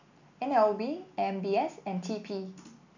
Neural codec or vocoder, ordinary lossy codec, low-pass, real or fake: none; none; 7.2 kHz; real